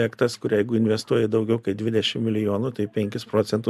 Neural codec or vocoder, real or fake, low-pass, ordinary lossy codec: none; real; 14.4 kHz; MP3, 96 kbps